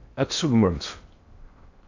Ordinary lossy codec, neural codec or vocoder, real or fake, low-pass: AAC, 48 kbps; codec, 16 kHz in and 24 kHz out, 0.6 kbps, FocalCodec, streaming, 2048 codes; fake; 7.2 kHz